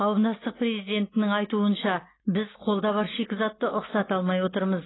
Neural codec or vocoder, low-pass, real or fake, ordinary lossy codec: none; 7.2 kHz; real; AAC, 16 kbps